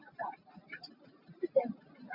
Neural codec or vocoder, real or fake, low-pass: none; real; 5.4 kHz